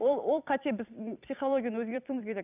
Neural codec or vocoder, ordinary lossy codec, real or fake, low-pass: vocoder, 44.1 kHz, 128 mel bands every 256 samples, BigVGAN v2; none; fake; 3.6 kHz